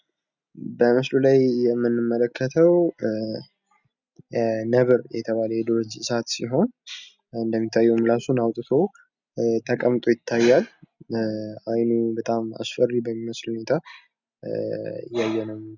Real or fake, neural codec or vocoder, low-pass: real; none; 7.2 kHz